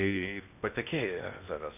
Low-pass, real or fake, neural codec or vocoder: 3.6 kHz; fake; codec, 16 kHz in and 24 kHz out, 0.8 kbps, FocalCodec, streaming, 65536 codes